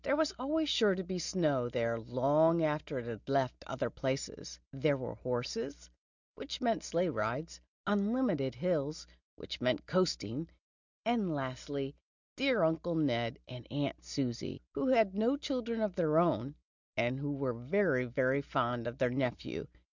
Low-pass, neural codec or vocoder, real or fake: 7.2 kHz; none; real